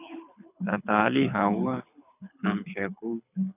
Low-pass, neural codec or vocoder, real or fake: 3.6 kHz; codec, 24 kHz, 6 kbps, HILCodec; fake